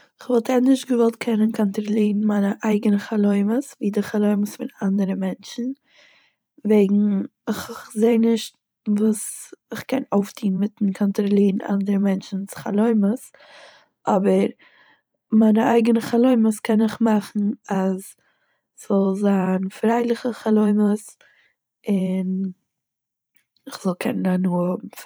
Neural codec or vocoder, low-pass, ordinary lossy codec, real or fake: vocoder, 44.1 kHz, 128 mel bands every 512 samples, BigVGAN v2; none; none; fake